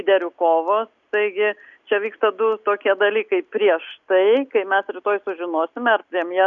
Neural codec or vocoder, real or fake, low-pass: none; real; 7.2 kHz